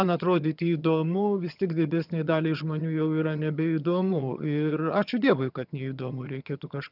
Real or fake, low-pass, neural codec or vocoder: fake; 5.4 kHz; vocoder, 22.05 kHz, 80 mel bands, HiFi-GAN